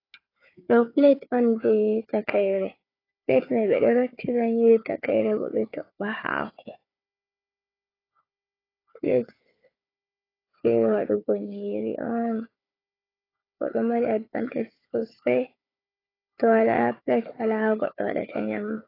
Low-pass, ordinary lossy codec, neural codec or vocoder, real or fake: 5.4 kHz; AAC, 24 kbps; codec, 16 kHz, 4 kbps, FunCodec, trained on Chinese and English, 50 frames a second; fake